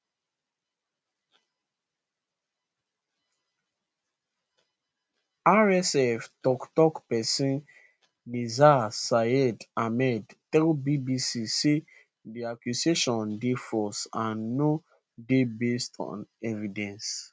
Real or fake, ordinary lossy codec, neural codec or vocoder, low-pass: real; none; none; none